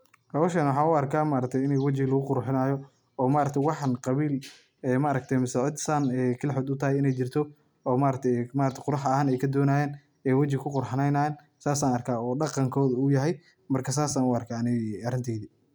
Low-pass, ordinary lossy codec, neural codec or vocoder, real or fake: none; none; none; real